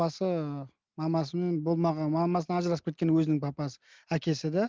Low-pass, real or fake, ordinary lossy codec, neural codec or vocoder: 7.2 kHz; real; Opus, 32 kbps; none